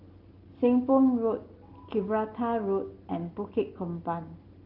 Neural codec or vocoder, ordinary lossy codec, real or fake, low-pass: none; Opus, 16 kbps; real; 5.4 kHz